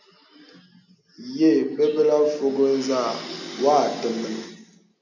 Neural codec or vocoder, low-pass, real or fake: none; 7.2 kHz; real